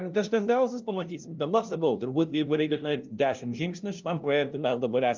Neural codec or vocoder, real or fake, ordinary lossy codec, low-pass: codec, 16 kHz, 0.5 kbps, FunCodec, trained on LibriTTS, 25 frames a second; fake; Opus, 24 kbps; 7.2 kHz